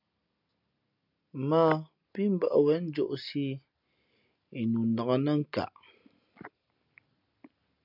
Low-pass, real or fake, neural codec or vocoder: 5.4 kHz; real; none